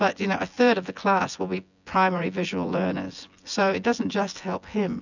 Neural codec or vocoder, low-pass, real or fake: vocoder, 24 kHz, 100 mel bands, Vocos; 7.2 kHz; fake